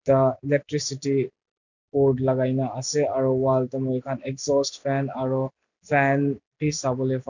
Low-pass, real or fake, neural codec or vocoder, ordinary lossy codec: 7.2 kHz; real; none; none